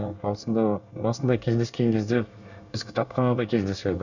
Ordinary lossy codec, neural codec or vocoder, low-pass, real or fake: none; codec, 24 kHz, 1 kbps, SNAC; 7.2 kHz; fake